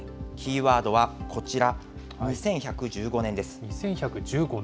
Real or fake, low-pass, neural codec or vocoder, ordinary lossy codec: real; none; none; none